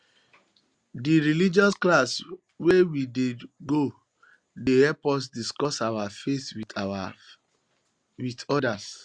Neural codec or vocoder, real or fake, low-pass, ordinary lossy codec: none; real; 9.9 kHz; Opus, 64 kbps